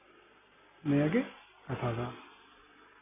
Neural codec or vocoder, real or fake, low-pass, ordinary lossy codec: none; real; 3.6 kHz; none